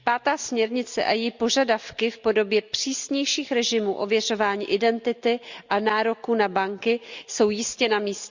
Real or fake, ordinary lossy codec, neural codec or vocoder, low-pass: real; Opus, 64 kbps; none; 7.2 kHz